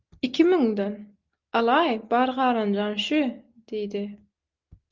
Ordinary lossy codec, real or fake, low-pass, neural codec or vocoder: Opus, 32 kbps; real; 7.2 kHz; none